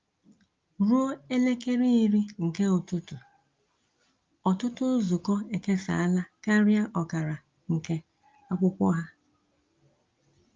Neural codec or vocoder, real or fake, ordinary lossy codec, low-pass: none; real; Opus, 32 kbps; 7.2 kHz